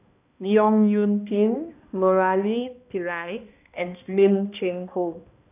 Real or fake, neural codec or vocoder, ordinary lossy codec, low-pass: fake; codec, 16 kHz, 1 kbps, X-Codec, HuBERT features, trained on balanced general audio; none; 3.6 kHz